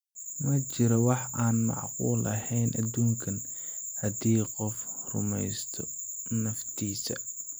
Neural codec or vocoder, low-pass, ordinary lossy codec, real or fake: none; none; none; real